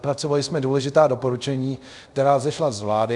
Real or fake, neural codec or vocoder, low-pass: fake; codec, 24 kHz, 0.5 kbps, DualCodec; 10.8 kHz